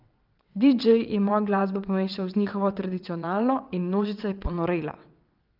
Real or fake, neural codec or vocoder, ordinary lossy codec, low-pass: fake; vocoder, 22.05 kHz, 80 mel bands, WaveNeXt; Opus, 24 kbps; 5.4 kHz